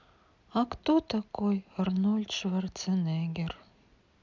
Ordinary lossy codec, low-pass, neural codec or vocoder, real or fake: none; 7.2 kHz; none; real